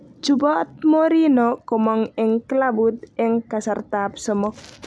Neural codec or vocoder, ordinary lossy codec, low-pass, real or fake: none; none; none; real